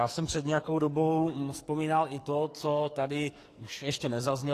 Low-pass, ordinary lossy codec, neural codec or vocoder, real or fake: 14.4 kHz; AAC, 48 kbps; codec, 44.1 kHz, 2.6 kbps, SNAC; fake